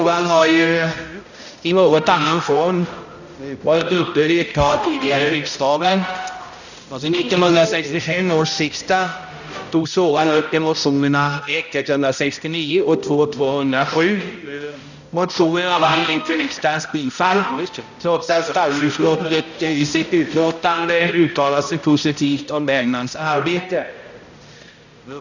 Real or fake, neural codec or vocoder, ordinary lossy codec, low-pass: fake; codec, 16 kHz, 0.5 kbps, X-Codec, HuBERT features, trained on balanced general audio; none; 7.2 kHz